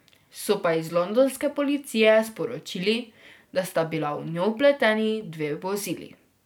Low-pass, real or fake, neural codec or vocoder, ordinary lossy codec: none; real; none; none